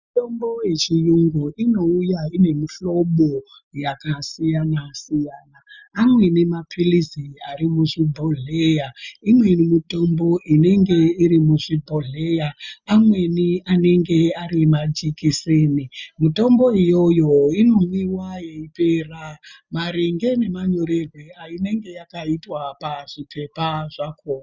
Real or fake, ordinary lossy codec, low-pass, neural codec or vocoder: real; Opus, 64 kbps; 7.2 kHz; none